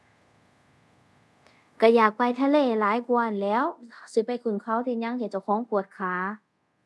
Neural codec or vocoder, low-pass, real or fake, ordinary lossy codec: codec, 24 kHz, 0.5 kbps, DualCodec; none; fake; none